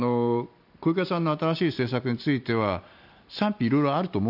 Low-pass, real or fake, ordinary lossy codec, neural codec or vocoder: 5.4 kHz; real; none; none